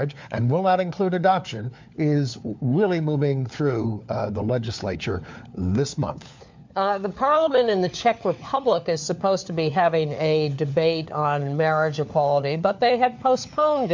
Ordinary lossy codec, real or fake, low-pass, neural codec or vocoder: MP3, 64 kbps; fake; 7.2 kHz; codec, 16 kHz, 4 kbps, FunCodec, trained on LibriTTS, 50 frames a second